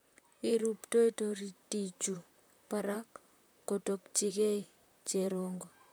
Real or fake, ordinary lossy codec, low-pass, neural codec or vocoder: fake; none; none; vocoder, 44.1 kHz, 128 mel bands, Pupu-Vocoder